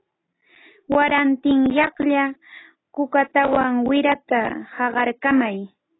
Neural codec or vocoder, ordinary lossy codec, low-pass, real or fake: none; AAC, 16 kbps; 7.2 kHz; real